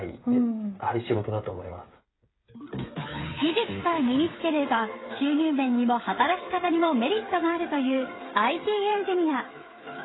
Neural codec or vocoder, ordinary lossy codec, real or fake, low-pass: codec, 16 kHz, 8 kbps, FreqCodec, smaller model; AAC, 16 kbps; fake; 7.2 kHz